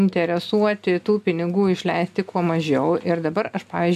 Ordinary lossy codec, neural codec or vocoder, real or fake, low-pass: MP3, 96 kbps; none; real; 14.4 kHz